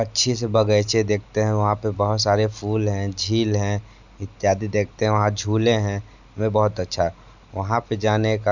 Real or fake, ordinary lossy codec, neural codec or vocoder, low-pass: real; none; none; 7.2 kHz